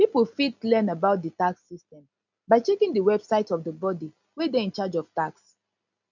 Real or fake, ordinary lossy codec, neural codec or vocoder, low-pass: real; none; none; 7.2 kHz